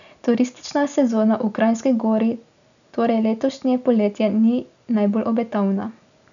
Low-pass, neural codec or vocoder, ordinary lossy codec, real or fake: 7.2 kHz; none; none; real